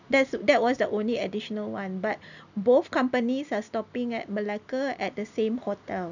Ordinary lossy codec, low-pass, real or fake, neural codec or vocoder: none; 7.2 kHz; real; none